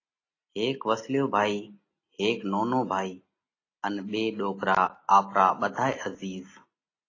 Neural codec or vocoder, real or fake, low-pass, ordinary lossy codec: none; real; 7.2 kHz; AAC, 32 kbps